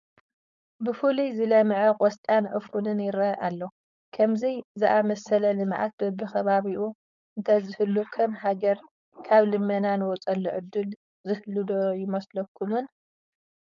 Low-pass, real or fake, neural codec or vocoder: 7.2 kHz; fake; codec, 16 kHz, 4.8 kbps, FACodec